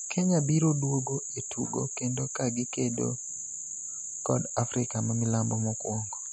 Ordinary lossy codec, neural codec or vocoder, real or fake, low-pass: MP3, 48 kbps; none; real; 9.9 kHz